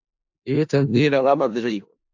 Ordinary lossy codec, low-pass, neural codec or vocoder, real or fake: AAC, 48 kbps; 7.2 kHz; codec, 16 kHz in and 24 kHz out, 0.4 kbps, LongCat-Audio-Codec, four codebook decoder; fake